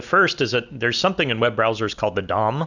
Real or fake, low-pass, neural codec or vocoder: real; 7.2 kHz; none